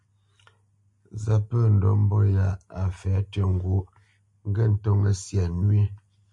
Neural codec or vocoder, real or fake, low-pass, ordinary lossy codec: none; real; 10.8 kHz; MP3, 48 kbps